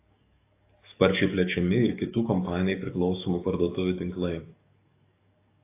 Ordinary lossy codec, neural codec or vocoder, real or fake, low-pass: AAC, 24 kbps; codec, 44.1 kHz, 7.8 kbps, DAC; fake; 3.6 kHz